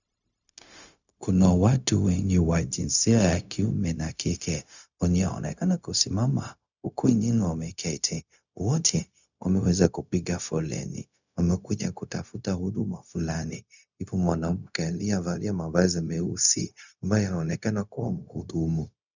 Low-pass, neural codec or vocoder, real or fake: 7.2 kHz; codec, 16 kHz, 0.4 kbps, LongCat-Audio-Codec; fake